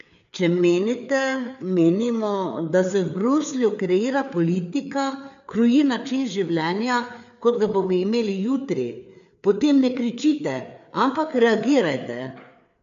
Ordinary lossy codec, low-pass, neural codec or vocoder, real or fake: none; 7.2 kHz; codec, 16 kHz, 4 kbps, FreqCodec, larger model; fake